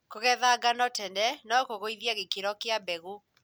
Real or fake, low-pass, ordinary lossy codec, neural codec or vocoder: real; none; none; none